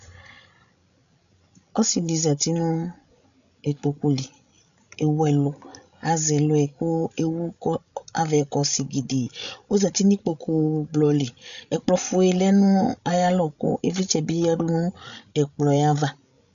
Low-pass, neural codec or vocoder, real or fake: 7.2 kHz; codec, 16 kHz, 16 kbps, FreqCodec, larger model; fake